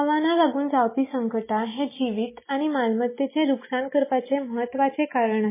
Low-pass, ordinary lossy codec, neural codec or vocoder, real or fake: 3.6 kHz; MP3, 16 kbps; none; real